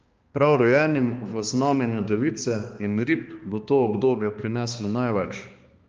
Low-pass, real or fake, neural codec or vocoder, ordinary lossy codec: 7.2 kHz; fake; codec, 16 kHz, 2 kbps, X-Codec, HuBERT features, trained on balanced general audio; Opus, 24 kbps